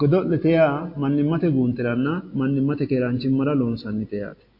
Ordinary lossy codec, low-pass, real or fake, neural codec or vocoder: MP3, 32 kbps; 5.4 kHz; real; none